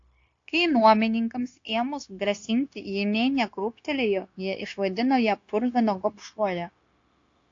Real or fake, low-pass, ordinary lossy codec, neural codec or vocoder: fake; 7.2 kHz; AAC, 48 kbps; codec, 16 kHz, 0.9 kbps, LongCat-Audio-Codec